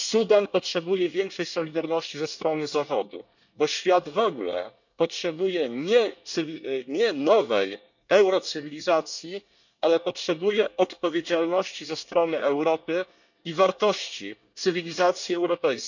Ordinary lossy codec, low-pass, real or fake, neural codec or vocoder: none; 7.2 kHz; fake; codec, 24 kHz, 1 kbps, SNAC